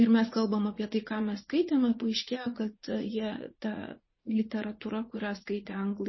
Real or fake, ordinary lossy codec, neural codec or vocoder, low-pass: fake; MP3, 24 kbps; vocoder, 44.1 kHz, 80 mel bands, Vocos; 7.2 kHz